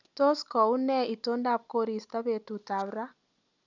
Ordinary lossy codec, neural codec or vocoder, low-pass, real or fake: none; none; 7.2 kHz; real